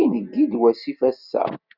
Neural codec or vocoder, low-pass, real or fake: none; 5.4 kHz; real